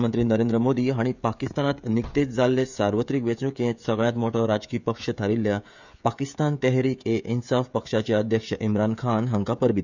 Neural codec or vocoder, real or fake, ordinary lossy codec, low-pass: codec, 16 kHz, 16 kbps, FreqCodec, smaller model; fake; none; 7.2 kHz